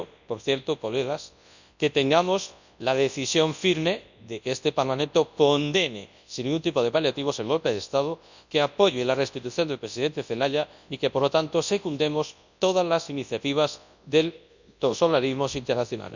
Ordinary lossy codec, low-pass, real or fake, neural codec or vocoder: none; 7.2 kHz; fake; codec, 24 kHz, 0.9 kbps, WavTokenizer, large speech release